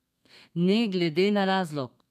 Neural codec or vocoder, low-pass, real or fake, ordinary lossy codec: codec, 32 kHz, 1.9 kbps, SNAC; 14.4 kHz; fake; none